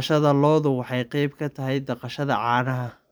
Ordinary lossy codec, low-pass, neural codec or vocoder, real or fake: none; none; vocoder, 44.1 kHz, 128 mel bands every 512 samples, BigVGAN v2; fake